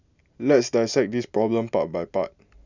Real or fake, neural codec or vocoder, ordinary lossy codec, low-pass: real; none; none; 7.2 kHz